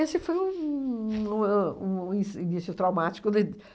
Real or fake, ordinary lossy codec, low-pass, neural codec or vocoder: real; none; none; none